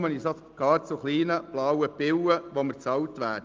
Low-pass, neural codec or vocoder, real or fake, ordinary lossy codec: 7.2 kHz; none; real; Opus, 32 kbps